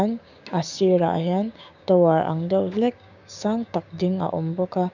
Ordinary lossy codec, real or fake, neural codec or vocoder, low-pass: none; fake; codec, 16 kHz, 6 kbps, DAC; 7.2 kHz